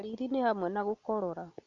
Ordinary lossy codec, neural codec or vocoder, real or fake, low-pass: Opus, 64 kbps; none; real; 7.2 kHz